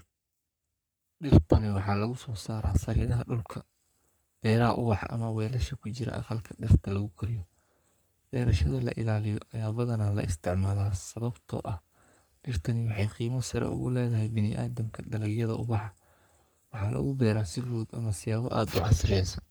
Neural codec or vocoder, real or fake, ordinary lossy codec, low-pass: codec, 44.1 kHz, 3.4 kbps, Pupu-Codec; fake; none; none